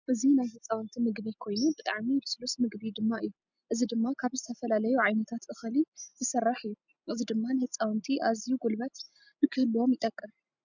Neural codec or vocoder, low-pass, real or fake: none; 7.2 kHz; real